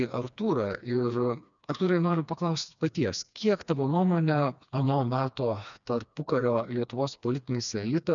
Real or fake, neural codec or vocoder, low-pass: fake; codec, 16 kHz, 2 kbps, FreqCodec, smaller model; 7.2 kHz